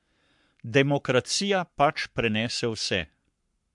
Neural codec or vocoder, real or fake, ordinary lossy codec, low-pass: codec, 44.1 kHz, 7.8 kbps, Pupu-Codec; fake; MP3, 64 kbps; 10.8 kHz